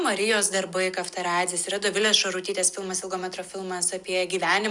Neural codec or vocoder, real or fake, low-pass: none; real; 10.8 kHz